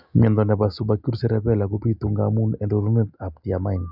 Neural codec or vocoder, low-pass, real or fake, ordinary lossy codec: none; 5.4 kHz; real; Opus, 64 kbps